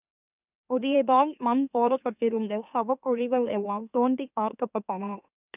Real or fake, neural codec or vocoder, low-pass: fake; autoencoder, 44.1 kHz, a latent of 192 numbers a frame, MeloTTS; 3.6 kHz